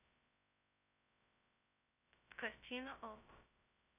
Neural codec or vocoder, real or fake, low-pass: codec, 16 kHz, 0.2 kbps, FocalCodec; fake; 3.6 kHz